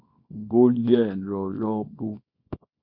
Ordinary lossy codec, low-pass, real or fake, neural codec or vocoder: MP3, 32 kbps; 5.4 kHz; fake; codec, 24 kHz, 0.9 kbps, WavTokenizer, small release